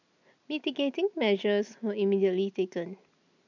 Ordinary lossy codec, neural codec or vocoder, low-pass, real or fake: none; none; 7.2 kHz; real